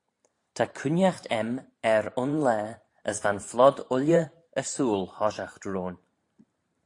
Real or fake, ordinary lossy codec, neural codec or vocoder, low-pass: fake; AAC, 48 kbps; vocoder, 44.1 kHz, 128 mel bands every 512 samples, BigVGAN v2; 10.8 kHz